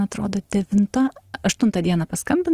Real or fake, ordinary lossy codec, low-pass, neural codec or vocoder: real; Opus, 24 kbps; 14.4 kHz; none